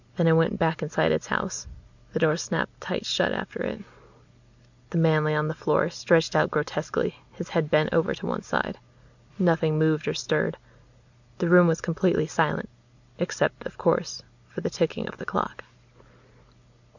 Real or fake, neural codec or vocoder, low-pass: real; none; 7.2 kHz